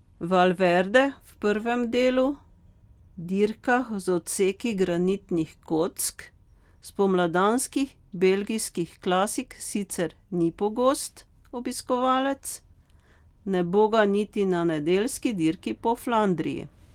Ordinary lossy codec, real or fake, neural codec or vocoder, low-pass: Opus, 24 kbps; real; none; 19.8 kHz